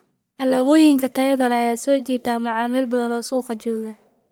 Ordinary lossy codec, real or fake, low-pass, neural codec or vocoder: none; fake; none; codec, 44.1 kHz, 1.7 kbps, Pupu-Codec